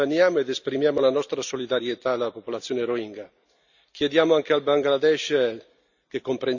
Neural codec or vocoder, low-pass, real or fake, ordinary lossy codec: none; 7.2 kHz; real; none